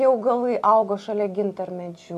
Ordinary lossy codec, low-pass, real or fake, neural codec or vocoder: MP3, 64 kbps; 14.4 kHz; real; none